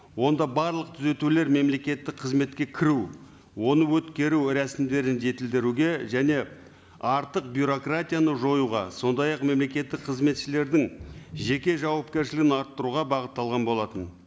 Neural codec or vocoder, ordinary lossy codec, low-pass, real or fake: none; none; none; real